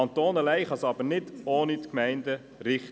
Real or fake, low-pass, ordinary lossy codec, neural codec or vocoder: real; none; none; none